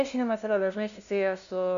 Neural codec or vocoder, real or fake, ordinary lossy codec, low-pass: codec, 16 kHz, 0.5 kbps, FunCodec, trained on LibriTTS, 25 frames a second; fake; AAC, 96 kbps; 7.2 kHz